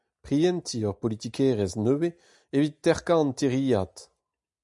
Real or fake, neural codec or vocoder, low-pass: real; none; 10.8 kHz